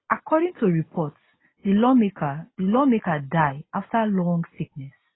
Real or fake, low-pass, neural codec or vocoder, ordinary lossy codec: real; 7.2 kHz; none; AAC, 16 kbps